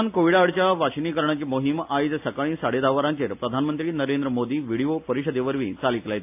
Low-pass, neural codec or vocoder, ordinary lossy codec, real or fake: 3.6 kHz; none; AAC, 32 kbps; real